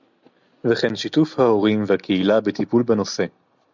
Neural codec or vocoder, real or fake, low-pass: none; real; 7.2 kHz